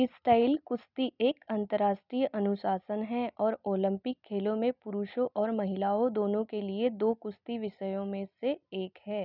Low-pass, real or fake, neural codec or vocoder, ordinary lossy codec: 5.4 kHz; real; none; none